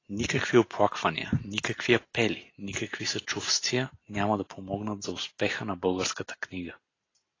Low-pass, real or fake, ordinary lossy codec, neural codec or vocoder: 7.2 kHz; real; AAC, 32 kbps; none